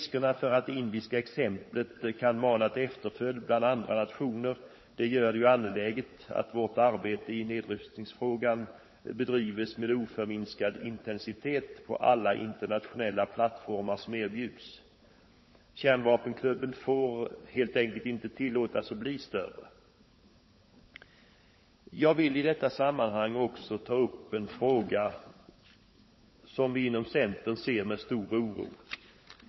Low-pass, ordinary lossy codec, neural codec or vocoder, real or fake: 7.2 kHz; MP3, 24 kbps; codec, 16 kHz, 16 kbps, FunCodec, trained on LibriTTS, 50 frames a second; fake